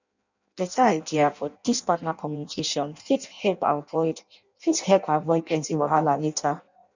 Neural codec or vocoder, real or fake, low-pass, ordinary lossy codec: codec, 16 kHz in and 24 kHz out, 0.6 kbps, FireRedTTS-2 codec; fake; 7.2 kHz; none